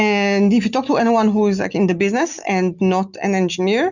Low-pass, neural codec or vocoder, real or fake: 7.2 kHz; none; real